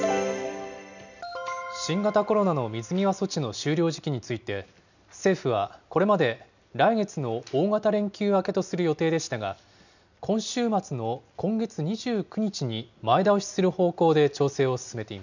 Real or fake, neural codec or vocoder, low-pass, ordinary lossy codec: real; none; 7.2 kHz; none